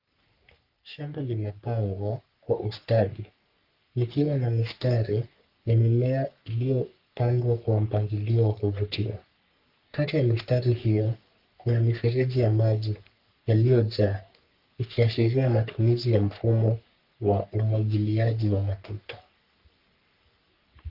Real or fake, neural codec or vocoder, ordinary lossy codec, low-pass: fake; codec, 44.1 kHz, 3.4 kbps, Pupu-Codec; Opus, 24 kbps; 5.4 kHz